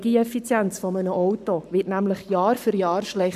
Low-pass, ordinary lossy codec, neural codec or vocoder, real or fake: 14.4 kHz; none; codec, 44.1 kHz, 7.8 kbps, Pupu-Codec; fake